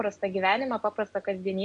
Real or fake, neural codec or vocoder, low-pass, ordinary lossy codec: real; none; 10.8 kHz; MP3, 48 kbps